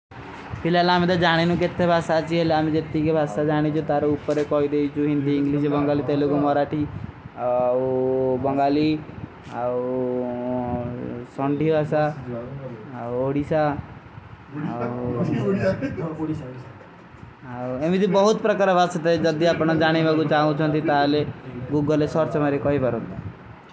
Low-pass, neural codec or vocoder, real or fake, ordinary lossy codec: none; none; real; none